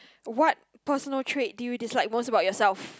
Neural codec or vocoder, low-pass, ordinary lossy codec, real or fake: none; none; none; real